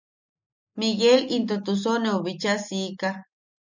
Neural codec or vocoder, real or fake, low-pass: none; real; 7.2 kHz